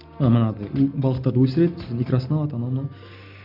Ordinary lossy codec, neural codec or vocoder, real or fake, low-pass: none; none; real; 5.4 kHz